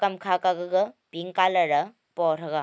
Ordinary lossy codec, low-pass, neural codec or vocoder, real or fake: none; none; none; real